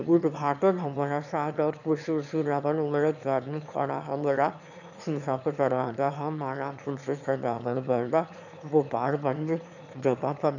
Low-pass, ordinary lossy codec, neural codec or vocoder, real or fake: 7.2 kHz; none; autoencoder, 22.05 kHz, a latent of 192 numbers a frame, VITS, trained on one speaker; fake